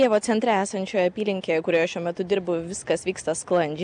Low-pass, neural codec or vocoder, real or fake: 9.9 kHz; none; real